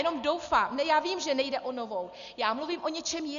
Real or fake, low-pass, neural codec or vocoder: real; 7.2 kHz; none